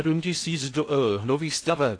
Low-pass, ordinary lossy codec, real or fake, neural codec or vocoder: 9.9 kHz; AAC, 48 kbps; fake; codec, 16 kHz in and 24 kHz out, 0.6 kbps, FocalCodec, streaming, 2048 codes